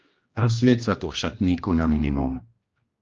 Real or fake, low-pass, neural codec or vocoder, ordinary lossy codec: fake; 7.2 kHz; codec, 16 kHz, 1 kbps, X-Codec, HuBERT features, trained on general audio; Opus, 24 kbps